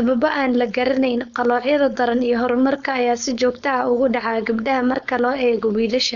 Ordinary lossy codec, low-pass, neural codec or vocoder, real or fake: none; 7.2 kHz; codec, 16 kHz, 4.8 kbps, FACodec; fake